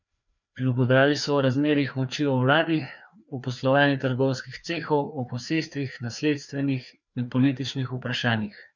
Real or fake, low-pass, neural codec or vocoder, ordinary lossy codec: fake; 7.2 kHz; codec, 16 kHz, 2 kbps, FreqCodec, larger model; none